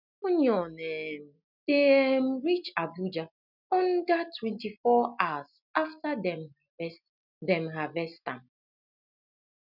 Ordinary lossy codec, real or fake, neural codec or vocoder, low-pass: none; real; none; 5.4 kHz